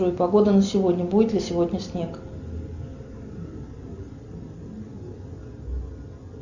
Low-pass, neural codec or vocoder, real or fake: 7.2 kHz; none; real